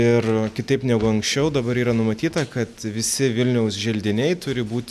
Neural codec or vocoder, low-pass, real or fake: none; 14.4 kHz; real